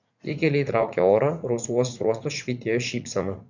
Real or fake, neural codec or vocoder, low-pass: fake; vocoder, 44.1 kHz, 80 mel bands, Vocos; 7.2 kHz